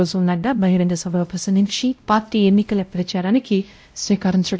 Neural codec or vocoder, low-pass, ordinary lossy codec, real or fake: codec, 16 kHz, 0.5 kbps, X-Codec, WavLM features, trained on Multilingual LibriSpeech; none; none; fake